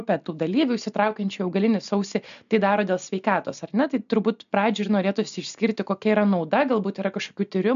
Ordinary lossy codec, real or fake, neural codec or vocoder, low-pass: MP3, 96 kbps; real; none; 7.2 kHz